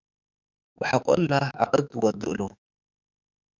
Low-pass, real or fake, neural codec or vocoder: 7.2 kHz; fake; autoencoder, 48 kHz, 32 numbers a frame, DAC-VAE, trained on Japanese speech